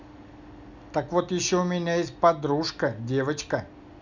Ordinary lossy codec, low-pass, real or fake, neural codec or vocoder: none; 7.2 kHz; real; none